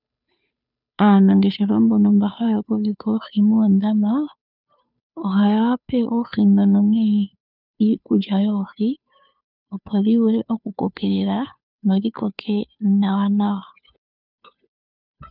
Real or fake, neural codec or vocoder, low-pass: fake; codec, 16 kHz, 2 kbps, FunCodec, trained on Chinese and English, 25 frames a second; 5.4 kHz